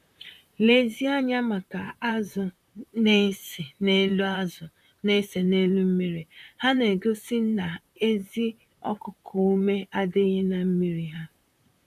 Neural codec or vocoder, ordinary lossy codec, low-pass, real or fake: vocoder, 44.1 kHz, 128 mel bands, Pupu-Vocoder; none; 14.4 kHz; fake